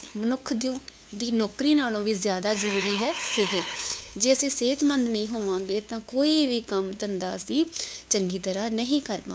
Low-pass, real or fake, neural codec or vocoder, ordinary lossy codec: none; fake; codec, 16 kHz, 2 kbps, FunCodec, trained on LibriTTS, 25 frames a second; none